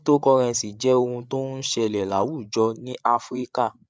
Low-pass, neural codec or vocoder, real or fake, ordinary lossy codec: none; codec, 16 kHz, 8 kbps, FreqCodec, larger model; fake; none